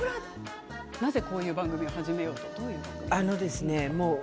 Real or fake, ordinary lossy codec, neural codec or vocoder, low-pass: real; none; none; none